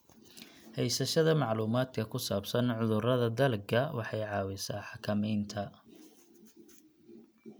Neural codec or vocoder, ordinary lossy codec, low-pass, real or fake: none; none; none; real